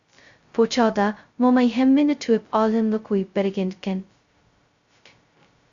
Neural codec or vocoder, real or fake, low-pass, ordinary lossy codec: codec, 16 kHz, 0.2 kbps, FocalCodec; fake; 7.2 kHz; Opus, 64 kbps